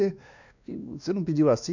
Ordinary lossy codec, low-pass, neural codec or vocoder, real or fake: none; 7.2 kHz; codec, 16 kHz, 2 kbps, X-Codec, WavLM features, trained on Multilingual LibriSpeech; fake